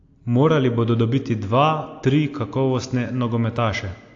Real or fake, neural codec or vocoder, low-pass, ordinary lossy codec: real; none; 7.2 kHz; AAC, 48 kbps